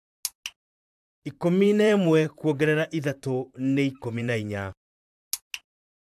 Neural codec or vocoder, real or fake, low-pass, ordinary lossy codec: vocoder, 44.1 kHz, 128 mel bands, Pupu-Vocoder; fake; 14.4 kHz; AAC, 96 kbps